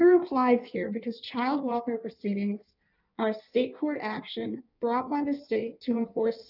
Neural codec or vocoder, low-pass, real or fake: codec, 16 kHz in and 24 kHz out, 1.1 kbps, FireRedTTS-2 codec; 5.4 kHz; fake